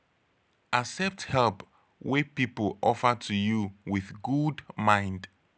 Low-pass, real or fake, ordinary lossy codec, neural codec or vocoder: none; real; none; none